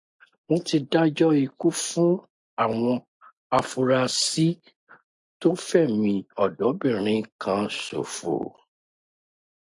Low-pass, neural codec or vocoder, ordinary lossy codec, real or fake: 10.8 kHz; none; AAC, 64 kbps; real